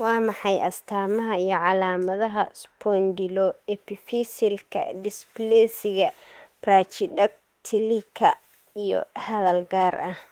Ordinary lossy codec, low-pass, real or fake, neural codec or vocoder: Opus, 24 kbps; 19.8 kHz; fake; autoencoder, 48 kHz, 32 numbers a frame, DAC-VAE, trained on Japanese speech